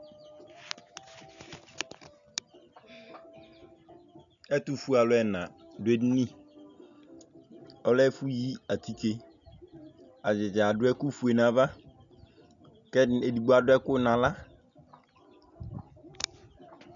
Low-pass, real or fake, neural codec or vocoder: 7.2 kHz; real; none